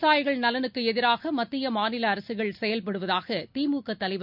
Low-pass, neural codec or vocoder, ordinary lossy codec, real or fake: 5.4 kHz; none; none; real